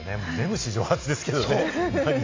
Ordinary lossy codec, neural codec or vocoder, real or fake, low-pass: AAC, 32 kbps; none; real; 7.2 kHz